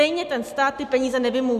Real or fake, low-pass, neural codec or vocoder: real; 14.4 kHz; none